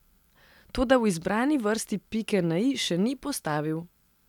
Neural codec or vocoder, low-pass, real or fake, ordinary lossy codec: none; 19.8 kHz; real; none